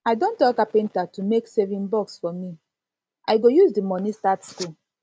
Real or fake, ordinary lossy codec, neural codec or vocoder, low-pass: real; none; none; none